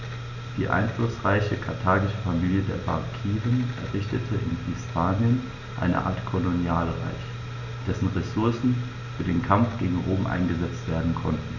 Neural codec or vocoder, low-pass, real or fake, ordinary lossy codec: none; 7.2 kHz; real; none